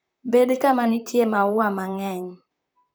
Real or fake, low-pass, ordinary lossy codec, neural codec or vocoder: fake; none; none; vocoder, 44.1 kHz, 128 mel bands, Pupu-Vocoder